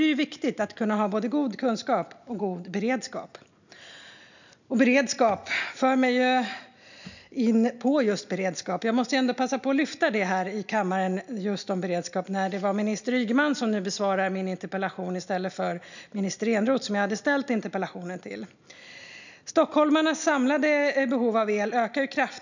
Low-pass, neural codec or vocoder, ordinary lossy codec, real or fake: 7.2 kHz; none; none; real